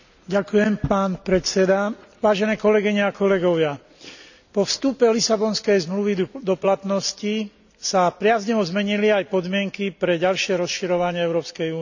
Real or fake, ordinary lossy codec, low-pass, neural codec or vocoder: real; none; 7.2 kHz; none